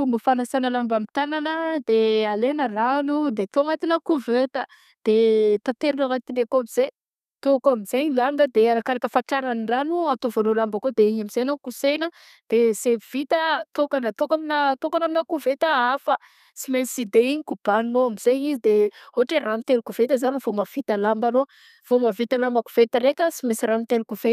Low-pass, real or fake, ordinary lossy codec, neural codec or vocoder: 14.4 kHz; fake; none; codec, 32 kHz, 1.9 kbps, SNAC